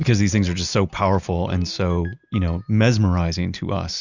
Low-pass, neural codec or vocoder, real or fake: 7.2 kHz; none; real